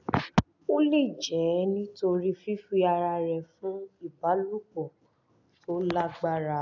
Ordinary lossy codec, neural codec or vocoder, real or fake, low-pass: none; none; real; 7.2 kHz